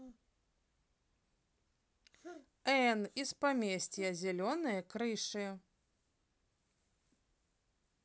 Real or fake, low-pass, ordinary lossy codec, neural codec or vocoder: real; none; none; none